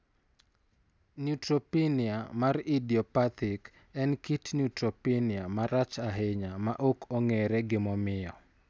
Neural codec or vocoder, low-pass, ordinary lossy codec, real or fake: none; none; none; real